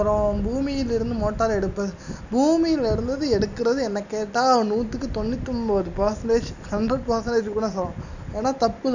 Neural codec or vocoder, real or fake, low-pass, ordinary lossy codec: none; real; 7.2 kHz; none